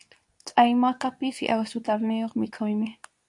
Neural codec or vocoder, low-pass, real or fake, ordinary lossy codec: codec, 24 kHz, 0.9 kbps, WavTokenizer, medium speech release version 2; 10.8 kHz; fake; AAC, 64 kbps